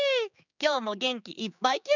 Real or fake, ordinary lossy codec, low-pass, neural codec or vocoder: fake; none; 7.2 kHz; codec, 16 kHz, 4 kbps, X-Codec, HuBERT features, trained on general audio